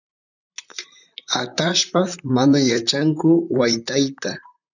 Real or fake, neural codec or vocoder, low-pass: fake; vocoder, 44.1 kHz, 128 mel bands, Pupu-Vocoder; 7.2 kHz